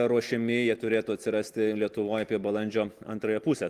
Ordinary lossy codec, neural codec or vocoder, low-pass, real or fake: Opus, 24 kbps; none; 14.4 kHz; real